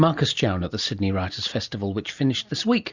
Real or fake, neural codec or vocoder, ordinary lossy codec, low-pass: real; none; Opus, 64 kbps; 7.2 kHz